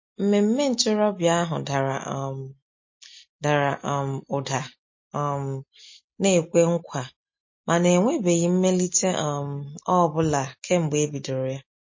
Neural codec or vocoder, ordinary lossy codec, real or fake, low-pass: none; MP3, 32 kbps; real; 7.2 kHz